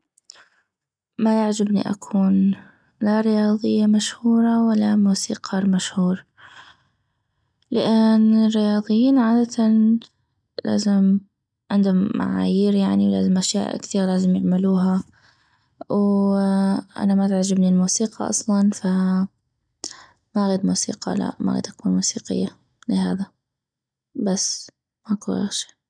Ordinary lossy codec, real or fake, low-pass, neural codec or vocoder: none; real; 9.9 kHz; none